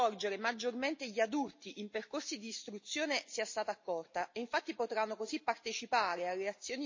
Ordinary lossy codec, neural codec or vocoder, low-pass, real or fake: MP3, 32 kbps; none; 7.2 kHz; real